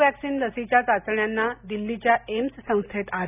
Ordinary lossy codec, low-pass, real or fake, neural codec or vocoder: none; 3.6 kHz; real; none